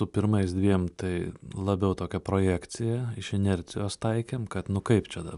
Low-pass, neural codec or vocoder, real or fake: 10.8 kHz; none; real